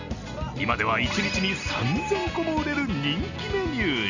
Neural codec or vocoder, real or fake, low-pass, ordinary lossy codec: none; real; 7.2 kHz; none